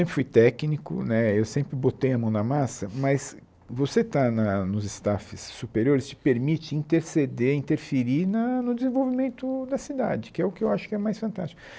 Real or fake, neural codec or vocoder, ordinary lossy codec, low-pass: real; none; none; none